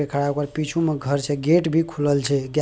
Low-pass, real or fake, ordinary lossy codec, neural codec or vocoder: none; real; none; none